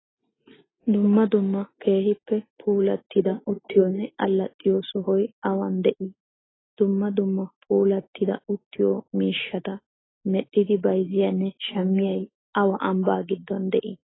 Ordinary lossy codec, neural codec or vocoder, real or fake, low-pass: AAC, 16 kbps; none; real; 7.2 kHz